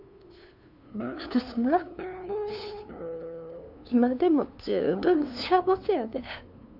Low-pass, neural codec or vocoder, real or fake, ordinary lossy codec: 5.4 kHz; codec, 16 kHz, 2 kbps, FunCodec, trained on LibriTTS, 25 frames a second; fake; none